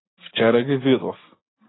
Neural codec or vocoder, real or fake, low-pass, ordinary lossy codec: none; real; 7.2 kHz; AAC, 16 kbps